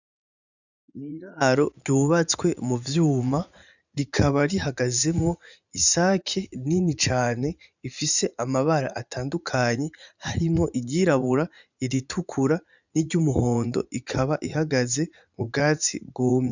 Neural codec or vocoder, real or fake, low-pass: vocoder, 44.1 kHz, 80 mel bands, Vocos; fake; 7.2 kHz